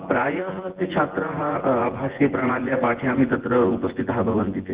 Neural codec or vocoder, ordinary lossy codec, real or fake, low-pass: vocoder, 24 kHz, 100 mel bands, Vocos; Opus, 16 kbps; fake; 3.6 kHz